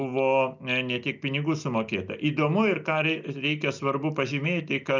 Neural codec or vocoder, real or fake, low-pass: none; real; 7.2 kHz